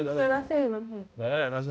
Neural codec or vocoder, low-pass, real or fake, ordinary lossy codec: codec, 16 kHz, 0.5 kbps, X-Codec, HuBERT features, trained on balanced general audio; none; fake; none